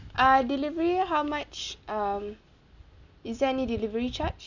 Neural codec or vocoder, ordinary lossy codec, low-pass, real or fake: none; none; 7.2 kHz; real